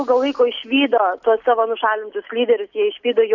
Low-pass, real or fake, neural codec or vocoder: 7.2 kHz; real; none